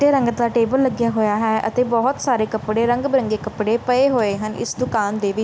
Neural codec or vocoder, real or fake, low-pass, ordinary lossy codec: none; real; none; none